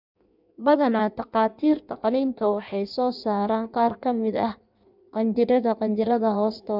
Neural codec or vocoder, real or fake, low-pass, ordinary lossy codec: codec, 16 kHz in and 24 kHz out, 1.1 kbps, FireRedTTS-2 codec; fake; 5.4 kHz; none